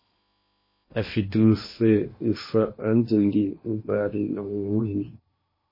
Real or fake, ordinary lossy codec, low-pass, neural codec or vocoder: fake; MP3, 24 kbps; 5.4 kHz; codec, 16 kHz in and 24 kHz out, 0.8 kbps, FocalCodec, streaming, 65536 codes